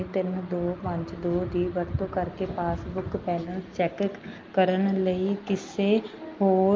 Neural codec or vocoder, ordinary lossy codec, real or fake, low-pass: none; Opus, 24 kbps; real; 7.2 kHz